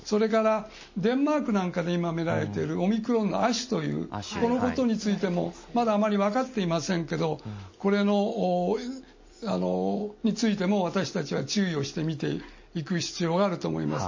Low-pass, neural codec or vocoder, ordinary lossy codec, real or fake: 7.2 kHz; none; MP3, 32 kbps; real